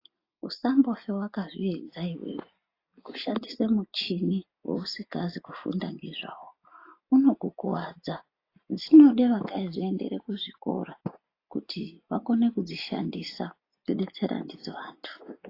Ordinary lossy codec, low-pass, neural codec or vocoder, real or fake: AAC, 32 kbps; 5.4 kHz; none; real